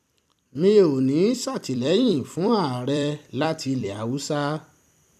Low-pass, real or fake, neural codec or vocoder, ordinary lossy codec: 14.4 kHz; fake; vocoder, 44.1 kHz, 128 mel bands every 256 samples, BigVGAN v2; none